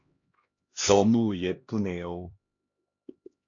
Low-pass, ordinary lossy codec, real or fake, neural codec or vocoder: 7.2 kHz; AAC, 48 kbps; fake; codec, 16 kHz, 1 kbps, X-Codec, HuBERT features, trained on LibriSpeech